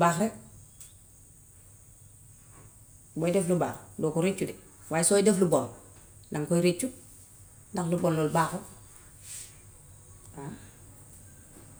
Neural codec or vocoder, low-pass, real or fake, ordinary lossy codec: none; none; real; none